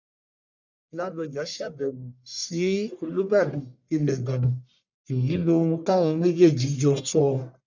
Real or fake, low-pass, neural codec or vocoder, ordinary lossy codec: fake; 7.2 kHz; codec, 44.1 kHz, 1.7 kbps, Pupu-Codec; none